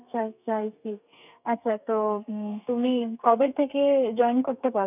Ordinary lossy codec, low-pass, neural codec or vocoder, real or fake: none; 3.6 kHz; codec, 32 kHz, 1.9 kbps, SNAC; fake